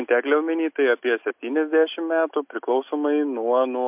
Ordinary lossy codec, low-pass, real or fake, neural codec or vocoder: MP3, 32 kbps; 3.6 kHz; real; none